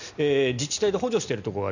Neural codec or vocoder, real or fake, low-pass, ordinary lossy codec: none; real; 7.2 kHz; none